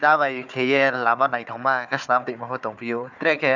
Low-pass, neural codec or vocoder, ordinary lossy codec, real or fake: 7.2 kHz; codec, 16 kHz, 4 kbps, FunCodec, trained on Chinese and English, 50 frames a second; none; fake